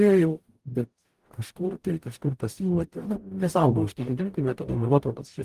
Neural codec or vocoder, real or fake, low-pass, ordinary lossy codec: codec, 44.1 kHz, 0.9 kbps, DAC; fake; 14.4 kHz; Opus, 24 kbps